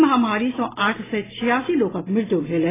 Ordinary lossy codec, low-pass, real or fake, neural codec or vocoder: AAC, 16 kbps; 3.6 kHz; real; none